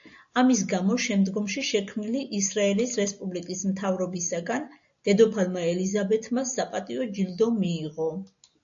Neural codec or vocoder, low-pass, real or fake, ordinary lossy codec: none; 7.2 kHz; real; AAC, 64 kbps